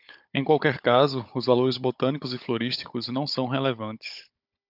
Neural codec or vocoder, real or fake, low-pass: codec, 16 kHz, 16 kbps, FunCodec, trained on Chinese and English, 50 frames a second; fake; 5.4 kHz